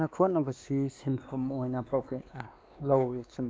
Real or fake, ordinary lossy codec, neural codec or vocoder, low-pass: fake; none; codec, 16 kHz, 2 kbps, X-Codec, WavLM features, trained on Multilingual LibriSpeech; none